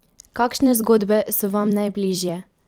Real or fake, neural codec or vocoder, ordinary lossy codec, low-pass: fake; vocoder, 44.1 kHz, 128 mel bands every 256 samples, BigVGAN v2; Opus, 32 kbps; 19.8 kHz